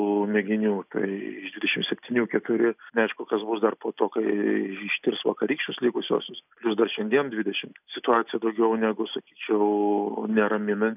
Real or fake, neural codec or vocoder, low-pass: real; none; 3.6 kHz